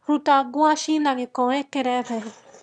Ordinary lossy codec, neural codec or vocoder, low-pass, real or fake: none; autoencoder, 22.05 kHz, a latent of 192 numbers a frame, VITS, trained on one speaker; 9.9 kHz; fake